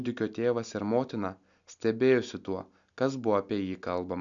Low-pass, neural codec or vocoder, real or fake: 7.2 kHz; none; real